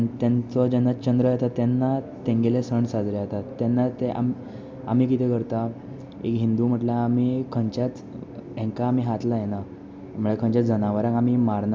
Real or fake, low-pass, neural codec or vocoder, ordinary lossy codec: real; none; none; none